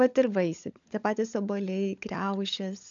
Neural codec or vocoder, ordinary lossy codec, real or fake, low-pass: codec, 16 kHz, 16 kbps, FunCodec, trained on LibriTTS, 50 frames a second; AAC, 64 kbps; fake; 7.2 kHz